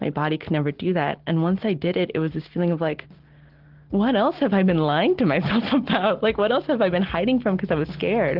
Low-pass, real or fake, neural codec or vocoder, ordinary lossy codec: 5.4 kHz; real; none; Opus, 16 kbps